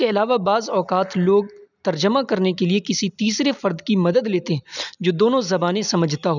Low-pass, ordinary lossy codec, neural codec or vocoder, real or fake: 7.2 kHz; none; none; real